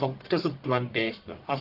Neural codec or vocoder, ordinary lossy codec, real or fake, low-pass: codec, 44.1 kHz, 1.7 kbps, Pupu-Codec; Opus, 32 kbps; fake; 5.4 kHz